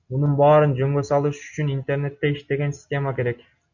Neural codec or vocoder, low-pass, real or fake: none; 7.2 kHz; real